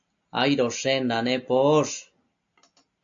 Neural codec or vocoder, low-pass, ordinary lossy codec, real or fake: none; 7.2 kHz; AAC, 64 kbps; real